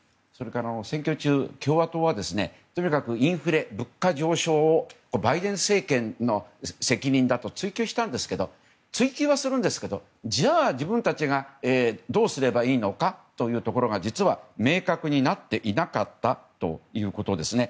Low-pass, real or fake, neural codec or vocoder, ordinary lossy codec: none; real; none; none